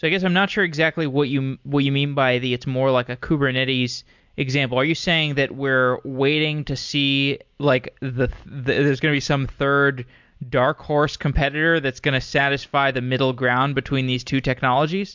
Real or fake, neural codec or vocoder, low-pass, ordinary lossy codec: real; none; 7.2 kHz; MP3, 64 kbps